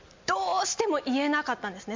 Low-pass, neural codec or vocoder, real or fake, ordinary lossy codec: 7.2 kHz; none; real; MP3, 48 kbps